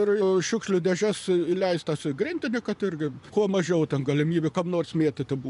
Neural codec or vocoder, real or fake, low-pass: vocoder, 24 kHz, 100 mel bands, Vocos; fake; 10.8 kHz